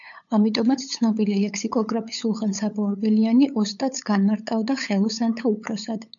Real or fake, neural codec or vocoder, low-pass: fake; codec, 16 kHz, 8 kbps, FunCodec, trained on LibriTTS, 25 frames a second; 7.2 kHz